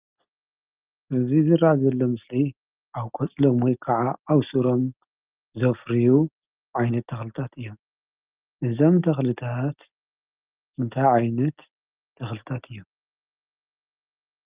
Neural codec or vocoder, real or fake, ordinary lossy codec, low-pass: none; real; Opus, 24 kbps; 3.6 kHz